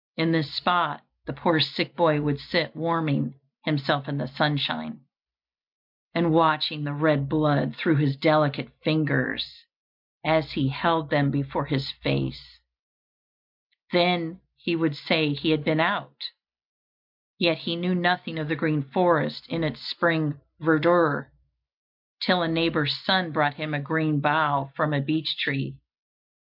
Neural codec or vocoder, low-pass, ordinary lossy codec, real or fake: none; 5.4 kHz; MP3, 48 kbps; real